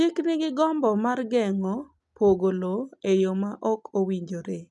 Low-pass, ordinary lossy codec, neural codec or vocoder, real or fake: 10.8 kHz; none; none; real